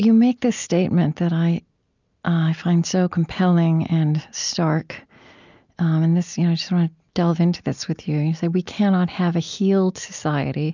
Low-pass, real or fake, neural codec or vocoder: 7.2 kHz; real; none